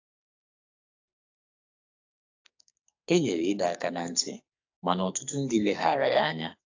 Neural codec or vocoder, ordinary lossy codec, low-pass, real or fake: codec, 44.1 kHz, 2.6 kbps, SNAC; AAC, 48 kbps; 7.2 kHz; fake